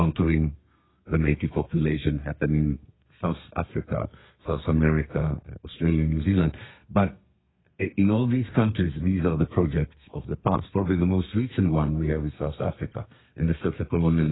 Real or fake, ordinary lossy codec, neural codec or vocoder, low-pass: fake; AAC, 16 kbps; codec, 32 kHz, 1.9 kbps, SNAC; 7.2 kHz